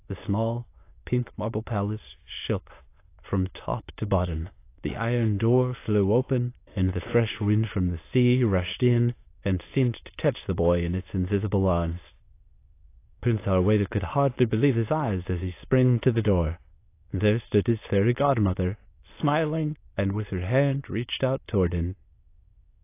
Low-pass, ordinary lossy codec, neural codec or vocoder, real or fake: 3.6 kHz; AAC, 24 kbps; autoencoder, 22.05 kHz, a latent of 192 numbers a frame, VITS, trained on many speakers; fake